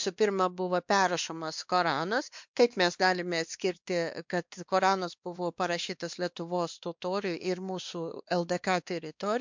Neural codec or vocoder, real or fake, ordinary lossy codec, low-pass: codec, 16 kHz, 2 kbps, X-Codec, WavLM features, trained on Multilingual LibriSpeech; fake; MP3, 64 kbps; 7.2 kHz